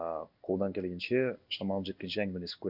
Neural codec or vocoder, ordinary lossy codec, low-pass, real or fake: codec, 16 kHz in and 24 kHz out, 1 kbps, XY-Tokenizer; none; 5.4 kHz; fake